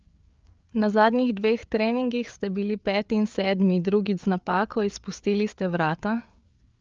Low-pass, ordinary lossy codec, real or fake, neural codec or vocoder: 7.2 kHz; Opus, 24 kbps; fake; codec, 16 kHz, 4 kbps, FreqCodec, larger model